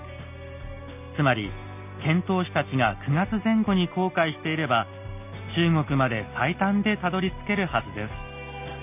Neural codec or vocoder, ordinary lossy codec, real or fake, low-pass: none; none; real; 3.6 kHz